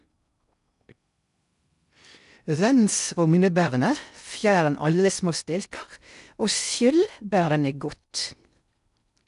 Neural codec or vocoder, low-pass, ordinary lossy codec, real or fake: codec, 16 kHz in and 24 kHz out, 0.6 kbps, FocalCodec, streaming, 2048 codes; 10.8 kHz; none; fake